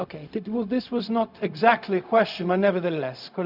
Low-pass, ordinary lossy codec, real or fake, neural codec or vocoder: 5.4 kHz; none; fake; codec, 16 kHz, 0.4 kbps, LongCat-Audio-Codec